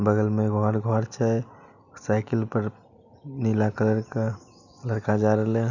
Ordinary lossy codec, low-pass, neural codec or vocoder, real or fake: none; 7.2 kHz; none; real